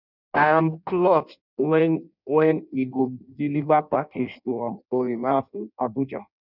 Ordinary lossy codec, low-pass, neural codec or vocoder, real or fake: none; 5.4 kHz; codec, 16 kHz in and 24 kHz out, 0.6 kbps, FireRedTTS-2 codec; fake